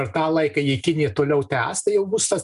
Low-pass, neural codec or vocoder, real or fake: 10.8 kHz; none; real